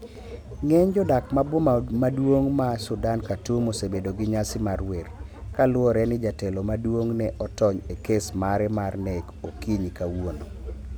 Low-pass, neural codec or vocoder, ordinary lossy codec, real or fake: 19.8 kHz; none; none; real